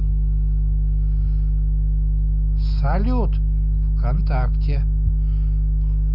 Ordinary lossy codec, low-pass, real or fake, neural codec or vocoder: none; 5.4 kHz; real; none